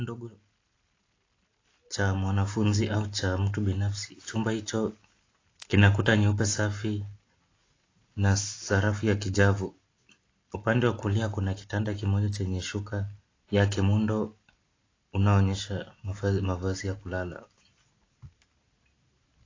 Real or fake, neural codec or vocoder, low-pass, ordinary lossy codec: real; none; 7.2 kHz; AAC, 32 kbps